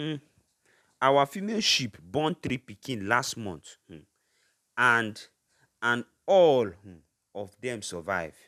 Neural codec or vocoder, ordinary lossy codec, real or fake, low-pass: none; none; real; 14.4 kHz